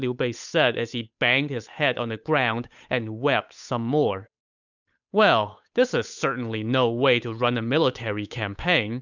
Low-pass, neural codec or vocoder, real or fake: 7.2 kHz; codec, 16 kHz, 8 kbps, FunCodec, trained on Chinese and English, 25 frames a second; fake